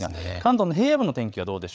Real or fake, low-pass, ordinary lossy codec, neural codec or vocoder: fake; none; none; codec, 16 kHz, 8 kbps, FreqCodec, larger model